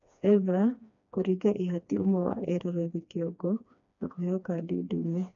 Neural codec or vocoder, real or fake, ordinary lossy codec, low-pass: codec, 16 kHz, 2 kbps, FreqCodec, smaller model; fake; none; 7.2 kHz